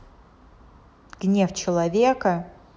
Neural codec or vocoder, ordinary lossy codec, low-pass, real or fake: none; none; none; real